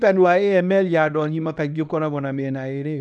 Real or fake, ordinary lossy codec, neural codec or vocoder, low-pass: fake; none; codec, 24 kHz, 0.9 kbps, WavTokenizer, small release; none